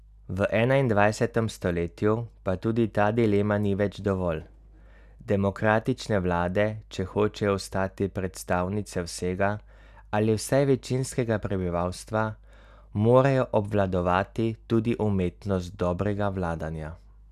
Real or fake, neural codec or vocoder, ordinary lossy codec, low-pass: real; none; none; 14.4 kHz